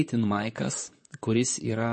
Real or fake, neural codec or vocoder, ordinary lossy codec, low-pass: fake; vocoder, 44.1 kHz, 128 mel bands, Pupu-Vocoder; MP3, 32 kbps; 10.8 kHz